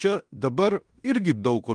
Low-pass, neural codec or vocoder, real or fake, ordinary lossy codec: 9.9 kHz; codec, 16 kHz in and 24 kHz out, 0.9 kbps, LongCat-Audio-Codec, fine tuned four codebook decoder; fake; Opus, 24 kbps